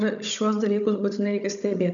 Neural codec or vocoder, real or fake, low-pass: codec, 16 kHz, 16 kbps, FunCodec, trained on Chinese and English, 50 frames a second; fake; 7.2 kHz